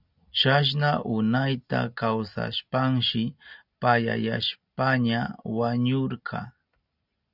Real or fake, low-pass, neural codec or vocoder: real; 5.4 kHz; none